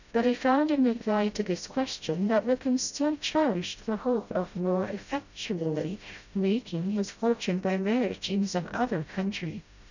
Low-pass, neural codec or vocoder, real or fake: 7.2 kHz; codec, 16 kHz, 0.5 kbps, FreqCodec, smaller model; fake